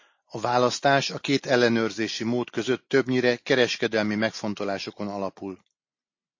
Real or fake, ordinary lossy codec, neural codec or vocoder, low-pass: real; MP3, 32 kbps; none; 7.2 kHz